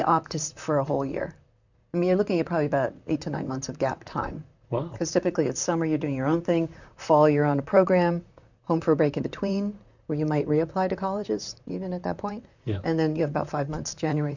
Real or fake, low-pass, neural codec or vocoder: fake; 7.2 kHz; vocoder, 44.1 kHz, 128 mel bands, Pupu-Vocoder